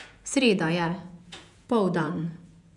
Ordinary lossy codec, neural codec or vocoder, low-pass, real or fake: none; vocoder, 48 kHz, 128 mel bands, Vocos; 10.8 kHz; fake